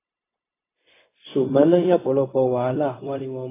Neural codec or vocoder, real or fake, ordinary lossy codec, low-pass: codec, 16 kHz, 0.4 kbps, LongCat-Audio-Codec; fake; AAC, 16 kbps; 3.6 kHz